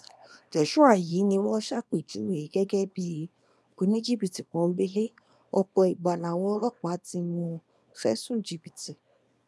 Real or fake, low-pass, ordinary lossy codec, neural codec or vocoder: fake; none; none; codec, 24 kHz, 0.9 kbps, WavTokenizer, small release